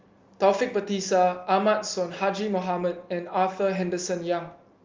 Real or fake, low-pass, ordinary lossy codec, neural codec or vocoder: real; 7.2 kHz; Opus, 32 kbps; none